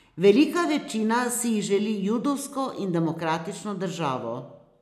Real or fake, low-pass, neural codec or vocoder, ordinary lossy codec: real; 14.4 kHz; none; none